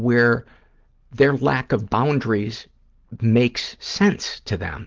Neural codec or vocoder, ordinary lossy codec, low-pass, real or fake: none; Opus, 16 kbps; 7.2 kHz; real